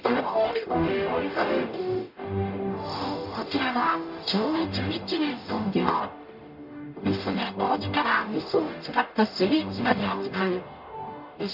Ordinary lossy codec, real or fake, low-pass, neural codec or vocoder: none; fake; 5.4 kHz; codec, 44.1 kHz, 0.9 kbps, DAC